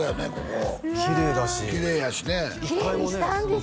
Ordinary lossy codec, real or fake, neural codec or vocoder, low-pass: none; real; none; none